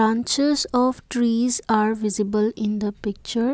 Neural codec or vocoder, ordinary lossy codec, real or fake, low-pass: none; none; real; none